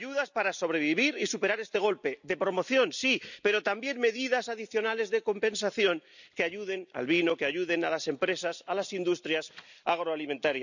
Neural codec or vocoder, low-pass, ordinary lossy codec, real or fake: none; 7.2 kHz; none; real